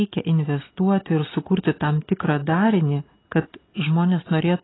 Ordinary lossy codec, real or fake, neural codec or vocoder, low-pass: AAC, 16 kbps; real; none; 7.2 kHz